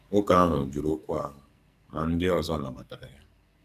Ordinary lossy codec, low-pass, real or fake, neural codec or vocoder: none; 14.4 kHz; fake; codec, 44.1 kHz, 2.6 kbps, SNAC